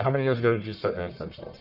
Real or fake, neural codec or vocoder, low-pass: fake; codec, 44.1 kHz, 1.7 kbps, Pupu-Codec; 5.4 kHz